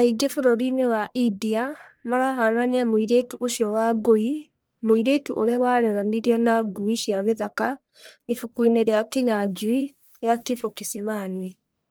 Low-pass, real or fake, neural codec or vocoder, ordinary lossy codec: none; fake; codec, 44.1 kHz, 1.7 kbps, Pupu-Codec; none